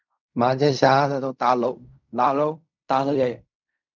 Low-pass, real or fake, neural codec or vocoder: 7.2 kHz; fake; codec, 16 kHz in and 24 kHz out, 0.4 kbps, LongCat-Audio-Codec, fine tuned four codebook decoder